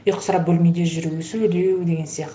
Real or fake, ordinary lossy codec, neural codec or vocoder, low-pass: real; none; none; none